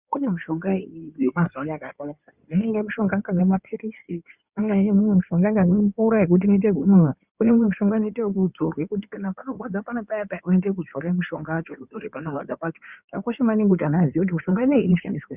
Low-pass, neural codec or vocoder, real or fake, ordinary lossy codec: 3.6 kHz; codec, 16 kHz in and 24 kHz out, 2.2 kbps, FireRedTTS-2 codec; fake; Opus, 64 kbps